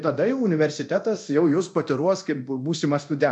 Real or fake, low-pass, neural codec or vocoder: fake; 10.8 kHz; codec, 24 kHz, 0.9 kbps, DualCodec